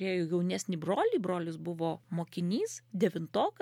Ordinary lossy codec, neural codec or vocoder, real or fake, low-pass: MP3, 96 kbps; none; real; 19.8 kHz